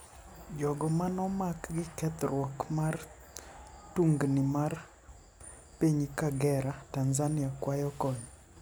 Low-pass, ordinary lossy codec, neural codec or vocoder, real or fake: none; none; none; real